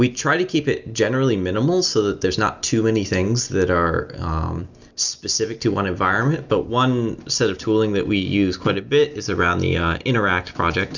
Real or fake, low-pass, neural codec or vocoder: real; 7.2 kHz; none